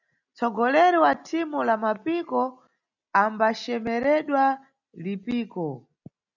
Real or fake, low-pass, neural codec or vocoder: real; 7.2 kHz; none